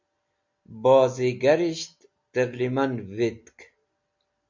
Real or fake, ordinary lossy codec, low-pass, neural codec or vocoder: real; MP3, 48 kbps; 7.2 kHz; none